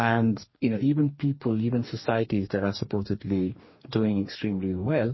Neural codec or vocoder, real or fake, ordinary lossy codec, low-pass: codec, 44.1 kHz, 2.6 kbps, DAC; fake; MP3, 24 kbps; 7.2 kHz